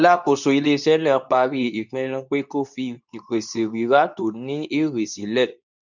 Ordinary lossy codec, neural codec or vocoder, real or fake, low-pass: none; codec, 24 kHz, 0.9 kbps, WavTokenizer, medium speech release version 2; fake; 7.2 kHz